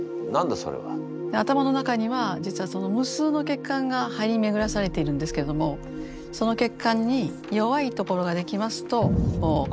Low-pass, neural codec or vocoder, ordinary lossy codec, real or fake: none; none; none; real